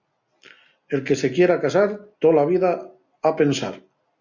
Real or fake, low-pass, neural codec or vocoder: real; 7.2 kHz; none